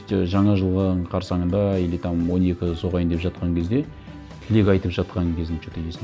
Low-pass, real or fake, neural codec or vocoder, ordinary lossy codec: none; real; none; none